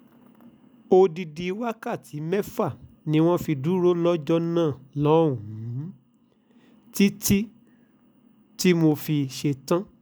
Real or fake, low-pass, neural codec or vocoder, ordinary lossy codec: real; none; none; none